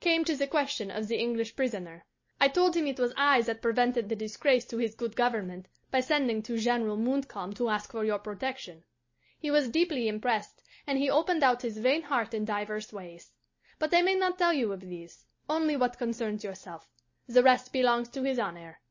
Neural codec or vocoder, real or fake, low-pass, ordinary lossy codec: none; real; 7.2 kHz; MP3, 32 kbps